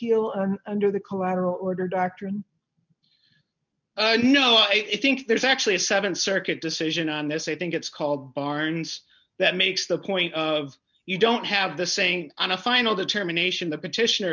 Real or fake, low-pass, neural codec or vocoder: real; 7.2 kHz; none